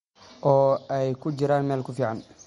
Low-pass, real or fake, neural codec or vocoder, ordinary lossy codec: 19.8 kHz; real; none; MP3, 48 kbps